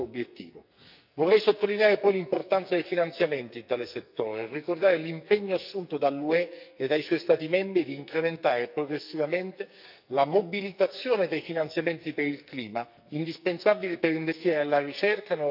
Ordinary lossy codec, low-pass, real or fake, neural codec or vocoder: none; 5.4 kHz; fake; codec, 44.1 kHz, 2.6 kbps, SNAC